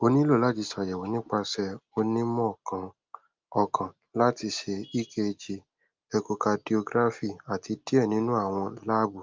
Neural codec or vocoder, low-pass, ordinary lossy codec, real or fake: none; 7.2 kHz; Opus, 24 kbps; real